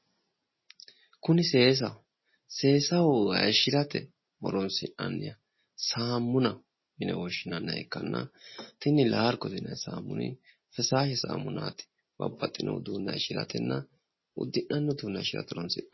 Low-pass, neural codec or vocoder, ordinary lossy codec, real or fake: 7.2 kHz; none; MP3, 24 kbps; real